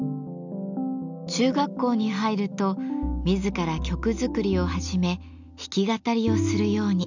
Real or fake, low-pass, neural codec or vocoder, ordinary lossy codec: real; 7.2 kHz; none; none